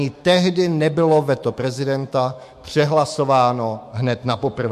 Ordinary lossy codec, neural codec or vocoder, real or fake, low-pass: MP3, 64 kbps; autoencoder, 48 kHz, 128 numbers a frame, DAC-VAE, trained on Japanese speech; fake; 14.4 kHz